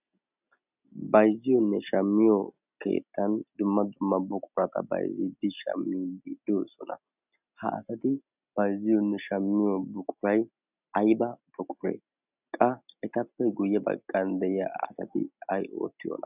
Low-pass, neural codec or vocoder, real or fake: 3.6 kHz; none; real